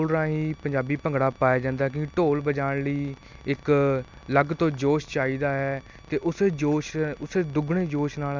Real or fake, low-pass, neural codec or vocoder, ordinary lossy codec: real; none; none; none